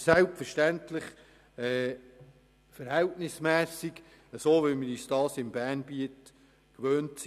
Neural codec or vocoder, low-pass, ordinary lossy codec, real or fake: none; 14.4 kHz; none; real